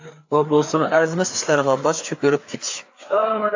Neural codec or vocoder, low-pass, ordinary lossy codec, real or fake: codec, 16 kHz, 4 kbps, FreqCodec, larger model; 7.2 kHz; AAC, 48 kbps; fake